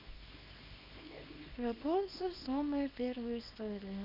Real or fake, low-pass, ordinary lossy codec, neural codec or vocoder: fake; 5.4 kHz; none; codec, 24 kHz, 0.9 kbps, WavTokenizer, small release